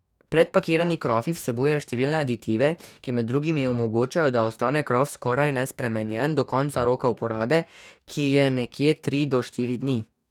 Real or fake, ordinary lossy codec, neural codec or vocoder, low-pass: fake; none; codec, 44.1 kHz, 2.6 kbps, DAC; 19.8 kHz